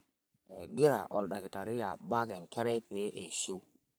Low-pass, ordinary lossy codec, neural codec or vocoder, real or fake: none; none; codec, 44.1 kHz, 3.4 kbps, Pupu-Codec; fake